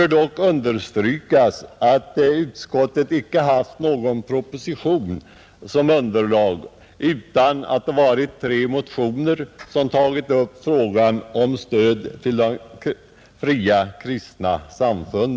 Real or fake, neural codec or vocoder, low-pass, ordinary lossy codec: real; none; none; none